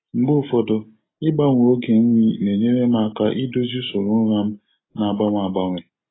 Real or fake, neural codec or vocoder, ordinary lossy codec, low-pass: real; none; AAC, 16 kbps; 7.2 kHz